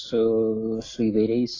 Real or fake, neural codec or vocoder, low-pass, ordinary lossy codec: real; none; 7.2 kHz; AAC, 32 kbps